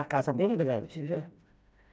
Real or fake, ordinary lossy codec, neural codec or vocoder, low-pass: fake; none; codec, 16 kHz, 1 kbps, FreqCodec, smaller model; none